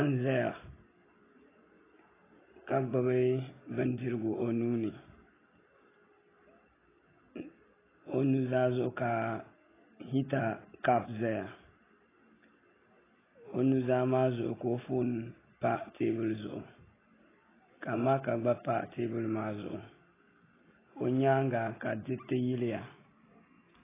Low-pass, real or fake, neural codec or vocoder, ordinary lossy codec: 3.6 kHz; real; none; AAC, 16 kbps